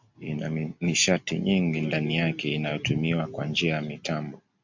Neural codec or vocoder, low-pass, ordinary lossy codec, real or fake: none; 7.2 kHz; MP3, 64 kbps; real